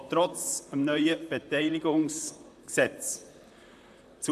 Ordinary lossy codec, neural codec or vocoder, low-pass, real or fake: none; vocoder, 44.1 kHz, 128 mel bands, Pupu-Vocoder; 14.4 kHz; fake